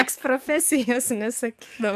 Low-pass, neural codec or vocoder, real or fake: 14.4 kHz; codec, 44.1 kHz, 7.8 kbps, DAC; fake